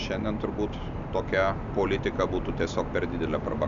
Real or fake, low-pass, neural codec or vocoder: real; 7.2 kHz; none